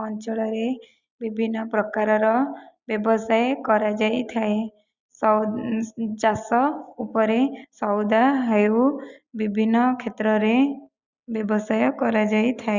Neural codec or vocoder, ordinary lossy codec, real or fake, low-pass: none; Opus, 64 kbps; real; 7.2 kHz